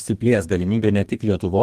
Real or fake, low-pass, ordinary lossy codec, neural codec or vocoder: fake; 14.4 kHz; Opus, 24 kbps; codec, 32 kHz, 1.9 kbps, SNAC